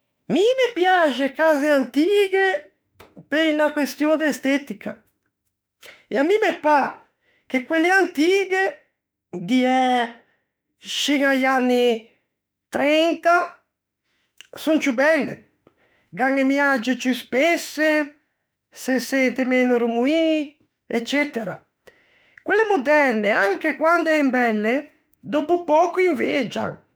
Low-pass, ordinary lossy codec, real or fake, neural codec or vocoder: none; none; fake; autoencoder, 48 kHz, 32 numbers a frame, DAC-VAE, trained on Japanese speech